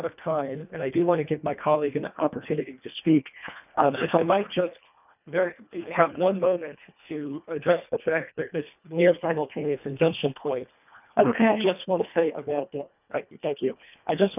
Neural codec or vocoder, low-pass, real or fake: codec, 24 kHz, 1.5 kbps, HILCodec; 3.6 kHz; fake